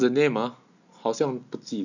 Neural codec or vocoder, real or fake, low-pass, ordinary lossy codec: none; real; 7.2 kHz; none